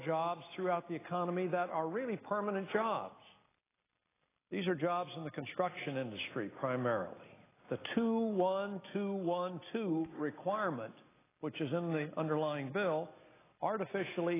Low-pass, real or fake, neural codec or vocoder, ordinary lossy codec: 3.6 kHz; real; none; AAC, 16 kbps